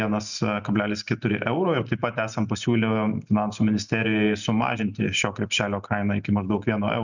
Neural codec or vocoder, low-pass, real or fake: none; 7.2 kHz; real